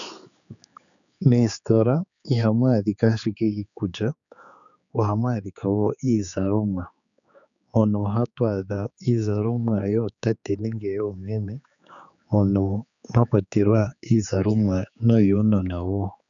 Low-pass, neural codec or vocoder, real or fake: 7.2 kHz; codec, 16 kHz, 4 kbps, X-Codec, HuBERT features, trained on general audio; fake